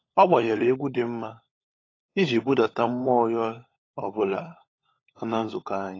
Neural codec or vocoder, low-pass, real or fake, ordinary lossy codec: codec, 16 kHz, 16 kbps, FunCodec, trained on LibriTTS, 50 frames a second; 7.2 kHz; fake; AAC, 32 kbps